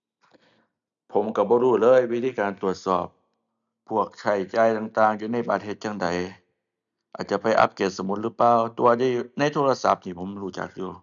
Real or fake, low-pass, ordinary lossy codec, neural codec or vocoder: real; 7.2 kHz; none; none